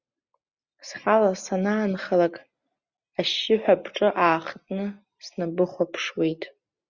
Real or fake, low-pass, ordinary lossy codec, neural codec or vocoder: real; 7.2 kHz; Opus, 64 kbps; none